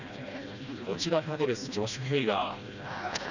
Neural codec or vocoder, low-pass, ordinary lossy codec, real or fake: codec, 16 kHz, 1 kbps, FreqCodec, smaller model; 7.2 kHz; none; fake